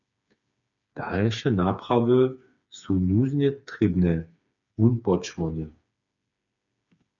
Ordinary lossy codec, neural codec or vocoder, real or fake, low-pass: MP3, 64 kbps; codec, 16 kHz, 4 kbps, FreqCodec, smaller model; fake; 7.2 kHz